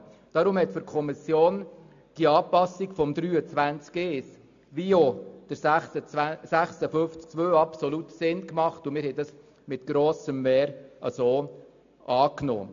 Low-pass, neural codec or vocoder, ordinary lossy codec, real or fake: 7.2 kHz; none; AAC, 64 kbps; real